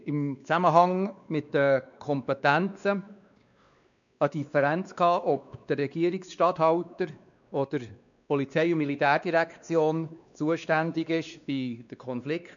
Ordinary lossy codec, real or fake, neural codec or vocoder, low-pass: none; fake; codec, 16 kHz, 2 kbps, X-Codec, WavLM features, trained on Multilingual LibriSpeech; 7.2 kHz